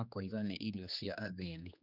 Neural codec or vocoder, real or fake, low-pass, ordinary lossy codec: codec, 16 kHz, 2 kbps, X-Codec, HuBERT features, trained on general audio; fake; 5.4 kHz; none